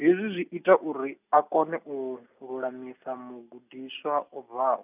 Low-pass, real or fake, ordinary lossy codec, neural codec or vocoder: 3.6 kHz; real; none; none